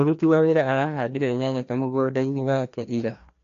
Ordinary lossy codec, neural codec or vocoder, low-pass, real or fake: AAC, 64 kbps; codec, 16 kHz, 1 kbps, FreqCodec, larger model; 7.2 kHz; fake